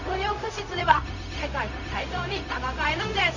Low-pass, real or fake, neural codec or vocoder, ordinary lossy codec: 7.2 kHz; fake; codec, 16 kHz, 0.4 kbps, LongCat-Audio-Codec; none